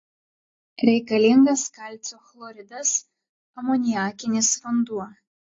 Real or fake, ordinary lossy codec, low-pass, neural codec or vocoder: real; AAC, 32 kbps; 7.2 kHz; none